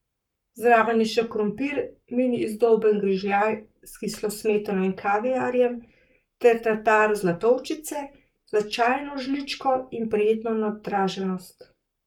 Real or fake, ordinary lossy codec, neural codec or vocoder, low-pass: fake; none; codec, 44.1 kHz, 7.8 kbps, Pupu-Codec; 19.8 kHz